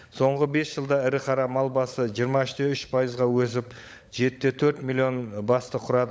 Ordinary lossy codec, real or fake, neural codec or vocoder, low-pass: none; fake; codec, 16 kHz, 16 kbps, FunCodec, trained on LibriTTS, 50 frames a second; none